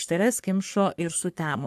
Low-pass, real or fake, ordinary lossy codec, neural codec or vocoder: 14.4 kHz; fake; AAC, 96 kbps; codec, 44.1 kHz, 3.4 kbps, Pupu-Codec